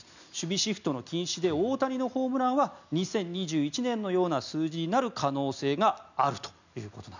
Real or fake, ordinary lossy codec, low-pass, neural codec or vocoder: real; none; 7.2 kHz; none